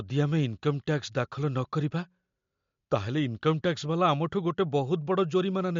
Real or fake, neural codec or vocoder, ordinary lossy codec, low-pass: real; none; MP3, 48 kbps; 7.2 kHz